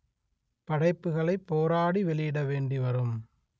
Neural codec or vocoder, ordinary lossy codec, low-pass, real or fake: none; none; none; real